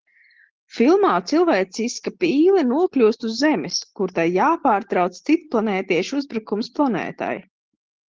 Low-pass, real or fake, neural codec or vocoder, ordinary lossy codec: 7.2 kHz; real; none; Opus, 16 kbps